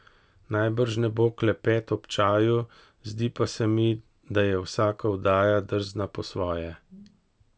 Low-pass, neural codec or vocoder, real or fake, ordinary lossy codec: none; none; real; none